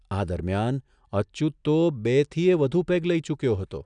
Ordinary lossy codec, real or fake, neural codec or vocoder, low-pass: none; real; none; 9.9 kHz